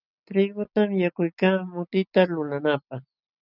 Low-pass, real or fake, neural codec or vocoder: 5.4 kHz; real; none